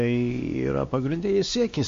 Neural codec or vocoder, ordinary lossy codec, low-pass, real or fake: codec, 16 kHz, 2 kbps, X-Codec, WavLM features, trained on Multilingual LibriSpeech; MP3, 48 kbps; 7.2 kHz; fake